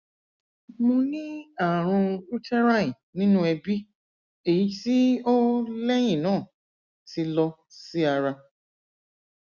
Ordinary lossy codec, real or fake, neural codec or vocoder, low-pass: Opus, 64 kbps; real; none; 7.2 kHz